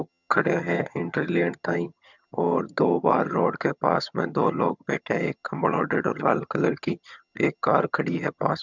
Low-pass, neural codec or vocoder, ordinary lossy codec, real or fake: 7.2 kHz; vocoder, 22.05 kHz, 80 mel bands, HiFi-GAN; none; fake